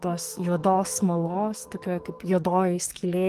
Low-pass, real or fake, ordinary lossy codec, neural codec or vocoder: 14.4 kHz; fake; Opus, 24 kbps; codec, 44.1 kHz, 2.6 kbps, SNAC